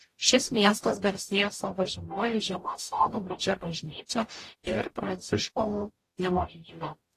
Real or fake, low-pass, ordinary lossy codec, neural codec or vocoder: fake; 14.4 kHz; AAC, 48 kbps; codec, 44.1 kHz, 0.9 kbps, DAC